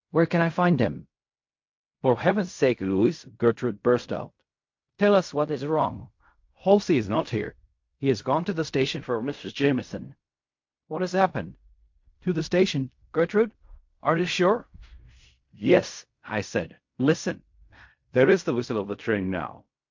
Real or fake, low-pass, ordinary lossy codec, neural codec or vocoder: fake; 7.2 kHz; MP3, 48 kbps; codec, 16 kHz in and 24 kHz out, 0.4 kbps, LongCat-Audio-Codec, fine tuned four codebook decoder